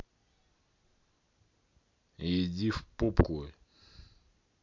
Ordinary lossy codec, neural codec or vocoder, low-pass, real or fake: MP3, 48 kbps; none; 7.2 kHz; real